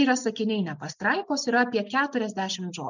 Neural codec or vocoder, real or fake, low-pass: none; real; 7.2 kHz